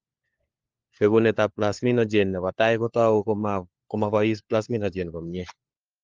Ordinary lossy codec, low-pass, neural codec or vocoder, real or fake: Opus, 32 kbps; 7.2 kHz; codec, 16 kHz, 4 kbps, FunCodec, trained on LibriTTS, 50 frames a second; fake